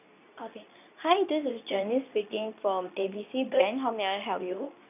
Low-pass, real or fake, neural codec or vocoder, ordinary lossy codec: 3.6 kHz; fake; codec, 24 kHz, 0.9 kbps, WavTokenizer, medium speech release version 2; none